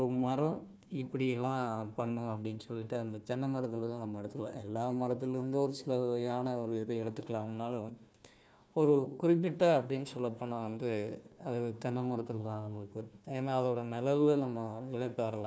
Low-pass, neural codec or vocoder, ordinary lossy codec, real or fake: none; codec, 16 kHz, 1 kbps, FunCodec, trained on Chinese and English, 50 frames a second; none; fake